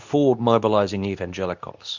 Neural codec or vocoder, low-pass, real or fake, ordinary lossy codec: codec, 24 kHz, 0.9 kbps, WavTokenizer, medium speech release version 2; 7.2 kHz; fake; Opus, 64 kbps